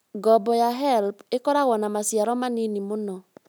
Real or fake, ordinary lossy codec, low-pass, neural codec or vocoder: real; none; none; none